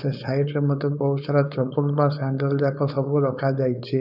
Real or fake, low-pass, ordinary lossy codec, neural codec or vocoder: fake; 5.4 kHz; none; codec, 16 kHz, 4.8 kbps, FACodec